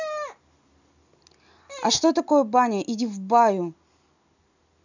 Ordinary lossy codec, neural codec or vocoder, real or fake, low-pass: none; none; real; 7.2 kHz